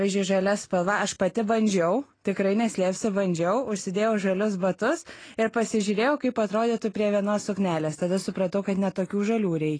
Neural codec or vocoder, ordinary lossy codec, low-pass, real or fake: none; AAC, 32 kbps; 9.9 kHz; real